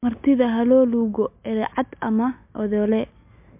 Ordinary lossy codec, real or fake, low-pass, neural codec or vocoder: MP3, 32 kbps; real; 3.6 kHz; none